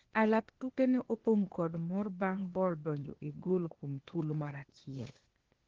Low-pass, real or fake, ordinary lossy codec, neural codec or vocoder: 7.2 kHz; fake; Opus, 16 kbps; codec, 16 kHz, 0.8 kbps, ZipCodec